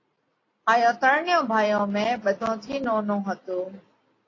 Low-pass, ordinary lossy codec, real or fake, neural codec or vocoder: 7.2 kHz; AAC, 32 kbps; real; none